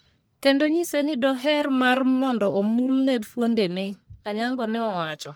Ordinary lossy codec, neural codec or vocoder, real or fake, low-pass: none; codec, 44.1 kHz, 1.7 kbps, Pupu-Codec; fake; none